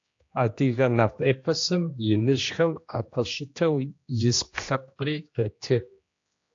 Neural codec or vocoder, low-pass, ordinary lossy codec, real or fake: codec, 16 kHz, 1 kbps, X-Codec, HuBERT features, trained on general audio; 7.2 kHz; AAC, 48 kbps; fake